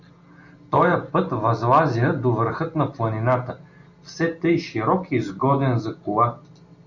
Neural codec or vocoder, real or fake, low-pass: none; real; 7.2 kHz